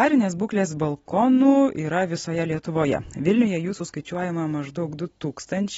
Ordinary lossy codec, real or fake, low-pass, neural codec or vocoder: AAC, 24 kbps; real; 19.8 kHz; none